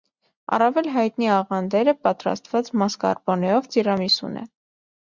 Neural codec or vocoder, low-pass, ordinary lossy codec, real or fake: none; 7.2 kHz; Opus, 64 kbps; real